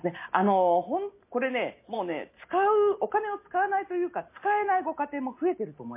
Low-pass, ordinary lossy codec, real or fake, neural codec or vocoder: 3.6 kHz; MP3, 24 kbps; real; none